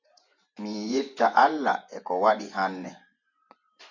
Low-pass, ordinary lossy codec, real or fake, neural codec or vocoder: 7.2 kHz; MP3, 64 kbps; fake; vocoder, 24 kHz, 100 mel bands, Vocos